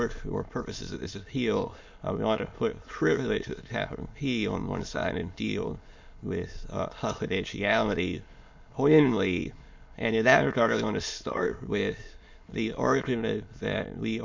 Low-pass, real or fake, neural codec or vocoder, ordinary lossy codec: 7.2 kHz; fake; autoencoder, 22.05 kHz, a latent of 192 numbers a frame, VITS, trained on many speakers; MP3, 48 kbps